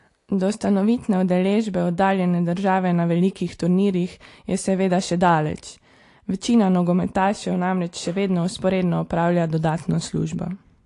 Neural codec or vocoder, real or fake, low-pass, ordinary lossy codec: none; real; 10.8 kHz; AAC, 48 kbps